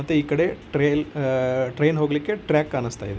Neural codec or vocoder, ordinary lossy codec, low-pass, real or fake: none; none; none; real